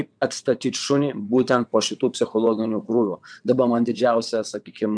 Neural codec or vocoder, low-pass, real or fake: vocoder, 22.05 kHz, 80 mel bands, WaveNeXt; 9.9 kHz; fake